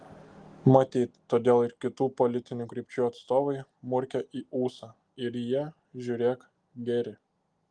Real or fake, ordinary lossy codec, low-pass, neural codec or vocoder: real; Opus, 24 kbps; 9.9 kHz; none